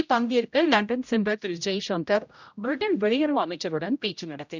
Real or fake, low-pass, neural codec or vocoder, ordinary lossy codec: fake; 7.2 kHz; codec, 16 kHz, 0.5 kbps, X-Codec, HuBERT features, trained on general audio; none